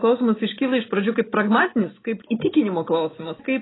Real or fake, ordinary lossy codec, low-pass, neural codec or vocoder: real; AAC, 16 kbps; 7.2 kHz; none